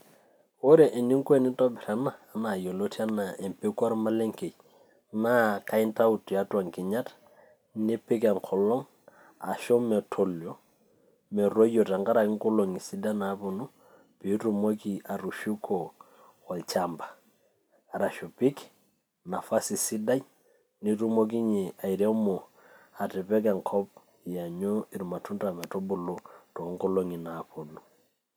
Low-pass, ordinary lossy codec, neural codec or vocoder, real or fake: none; none; none; real